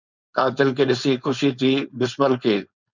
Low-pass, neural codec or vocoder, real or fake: 7.2 kHz; codec, 16 kHz, 4.8 kbps, FACodec; fake